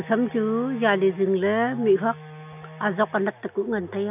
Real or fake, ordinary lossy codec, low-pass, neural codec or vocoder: real; none; 3.6 kHz; none